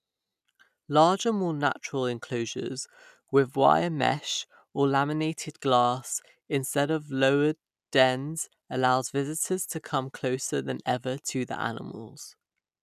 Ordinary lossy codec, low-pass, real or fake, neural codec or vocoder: none; 14.4 kHz; real; none